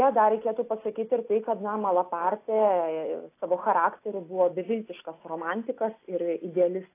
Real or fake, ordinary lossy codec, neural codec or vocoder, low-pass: real; AAC, 24 kbps; none; 3.6 kHz